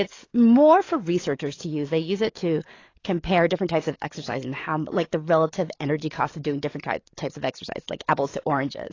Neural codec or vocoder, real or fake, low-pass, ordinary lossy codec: codec, 44.1 kHz, 7.8 kbps, DAC; fake; 7.2 kHz; AAC, 32 kbps